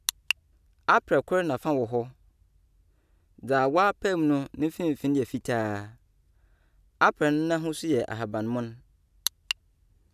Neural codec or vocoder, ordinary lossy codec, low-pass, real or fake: none; none; 14.4 kHz; real